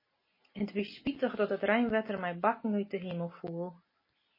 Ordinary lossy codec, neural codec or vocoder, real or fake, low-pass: MP3, 24 kbps; none; real; 5.4 kHz